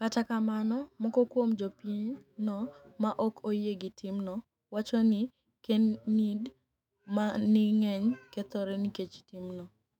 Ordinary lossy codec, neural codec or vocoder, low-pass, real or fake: none; autoencoder, 48 kHz, 128 numbers a frame, DAC-VAE, trained on Japanese speech; 19.8 kHz; fake